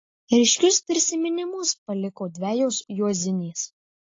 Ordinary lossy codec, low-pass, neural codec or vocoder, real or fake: AAC, 32 kbps; 7.2 kHz; none; real